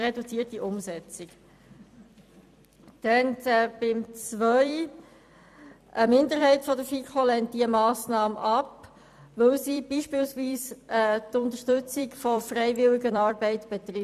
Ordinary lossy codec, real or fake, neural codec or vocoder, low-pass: none; fake; vocoder, 44.1 kHz, 128 mel bands every 256 samples, BigVGAN v2; 14.4 kHz